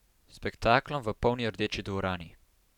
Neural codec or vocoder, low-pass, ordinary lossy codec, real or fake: vocoder, 44.1 kHz, 128 mel bands every 512 samples, BigVGAN v2; 19.8 kHz; none; fake